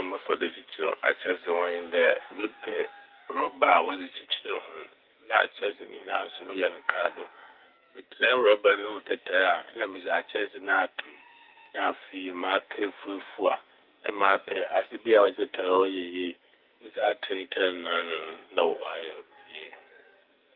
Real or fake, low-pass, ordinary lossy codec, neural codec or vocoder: fake; 5.4 kHz; Opus, 32 kbps; codec, 44.1 kHz, 2.6 kbps, SNAC